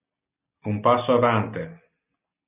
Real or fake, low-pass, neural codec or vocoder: real; 3.6 kHz; none